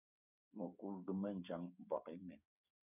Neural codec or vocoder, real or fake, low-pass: codec, 16 kHz, 8 kbps, FreqCodec, smaller model; fake; 3.6 kHz